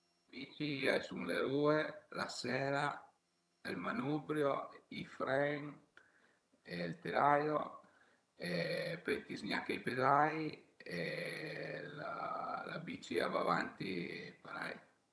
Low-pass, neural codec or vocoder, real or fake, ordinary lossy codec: none; vocoder, 22.05 kHz, 80 mel bands, HiFi-GAN; fake; none